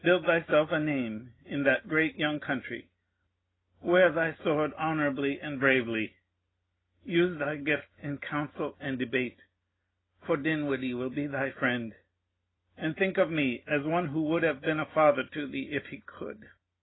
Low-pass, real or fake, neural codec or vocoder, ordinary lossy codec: 7.2 kHz; real; none; AAC, 16 kbps